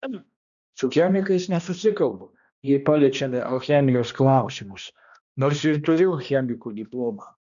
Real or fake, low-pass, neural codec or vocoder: fake; 7.2 kHz; codec, 16 kHz, 1 kbps, X-Codec, HuBERT features, trained on balanced general audio